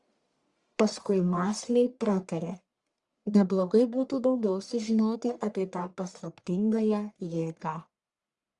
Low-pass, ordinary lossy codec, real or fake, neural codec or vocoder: 10.8 kHz; Opus, 64 kbps; fake; codec, 44.1 kHz, 1.7 kbps, Pupu-Codec